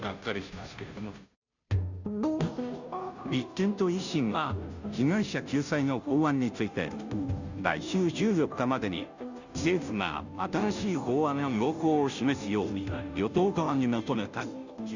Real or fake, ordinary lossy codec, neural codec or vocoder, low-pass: fake; none; codec, 16 kHz, 0.5 kbps, FunCodec, trained on Chinese and English, 25 frames a second; 7.2 kHz